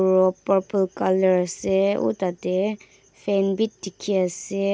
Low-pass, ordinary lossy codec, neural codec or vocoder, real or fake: none; none; none; real